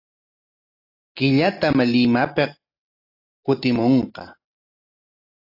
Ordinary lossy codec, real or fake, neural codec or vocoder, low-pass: AAC, 48 kbps; real; none; 5.4 kHz